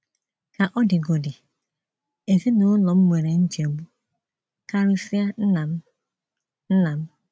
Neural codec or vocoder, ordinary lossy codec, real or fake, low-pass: none; none; real; none